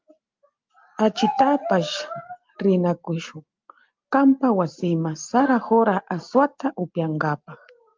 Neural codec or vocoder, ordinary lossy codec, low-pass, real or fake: none; Opus, 24 kbps; 7.2 kHz; real